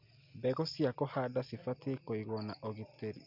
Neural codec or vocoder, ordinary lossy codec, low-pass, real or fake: none; MP3, 48 kbps; 5.4 kHz; real